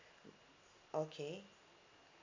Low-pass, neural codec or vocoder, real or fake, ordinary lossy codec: 7.2 kHz; none; real; MP3, 64 kbps